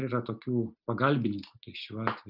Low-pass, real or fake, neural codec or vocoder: 5.4 kHz; real; none